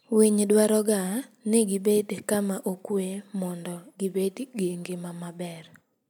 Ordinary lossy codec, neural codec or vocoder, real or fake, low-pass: none; none; real; none